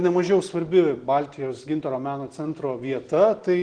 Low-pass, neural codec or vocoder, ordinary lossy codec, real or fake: 9.9 kHz; none; Opus, 16 kbps; real